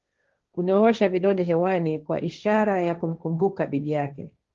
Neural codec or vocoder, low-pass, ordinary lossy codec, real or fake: codec, 16 kHz, 1.1 kbps, Voila-Tokenizer; 7.2 kHz; Opus, 32 kbps; fake